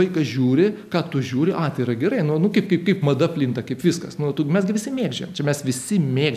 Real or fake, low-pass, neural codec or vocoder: real; 14.4 kHz; none